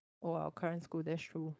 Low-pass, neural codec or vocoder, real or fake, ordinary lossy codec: none; codec, 16 kHz, 4.8 kbps, FACodec; fake; none